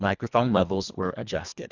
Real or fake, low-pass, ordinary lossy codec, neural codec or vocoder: fake; 7.2 kHz; Opus, 64 kbps; codec, 24 kHz, 1.5 kbps, HILCodec